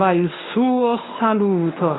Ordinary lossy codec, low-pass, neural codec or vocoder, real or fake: AAC, 16 kbps; 7.2 kHz; codec, 24 kHz, 0.9 kbps, WavTokenizer, medium speech release version 1; fake